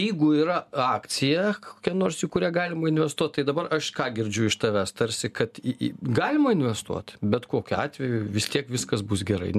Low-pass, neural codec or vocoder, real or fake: 14.4 kHz; none; real